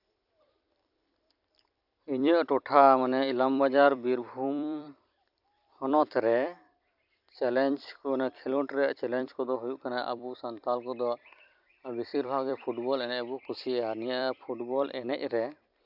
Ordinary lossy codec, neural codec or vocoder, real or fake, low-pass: none; vocoder, 44.1 kHz, 128 mel bands every 256 samples, BigVGAN v2; fake; 5.4 kHz